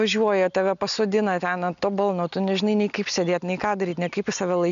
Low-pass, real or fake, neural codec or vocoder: 7.2 kHz; real; none